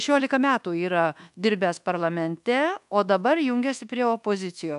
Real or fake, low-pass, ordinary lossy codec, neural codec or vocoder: fake; 10.8 kHz; AAC, 96 kbps; codec, 24 kHz, 1.2 kbps, DualCodec